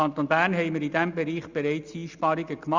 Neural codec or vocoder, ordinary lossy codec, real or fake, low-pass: none; Opus, 64 kbps; real; 7.2 kHz